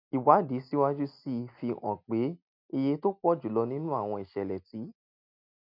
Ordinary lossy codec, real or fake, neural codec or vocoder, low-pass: none; real; none; 5.4 kHz